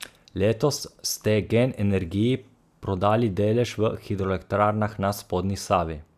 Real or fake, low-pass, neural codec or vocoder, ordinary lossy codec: real; 14.4 kHz; none; none